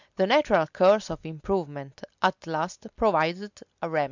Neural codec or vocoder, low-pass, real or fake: none; 7.2 kHz; real